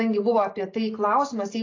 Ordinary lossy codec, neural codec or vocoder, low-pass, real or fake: AAC, 32 kbps; none; 7.2 kHz; real